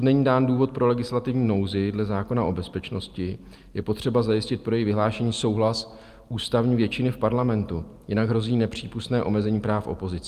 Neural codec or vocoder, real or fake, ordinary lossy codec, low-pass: none; real; Opus, 32 kbps; 14.4 kHz